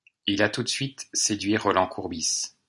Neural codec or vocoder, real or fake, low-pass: none; real; 10.8 kHz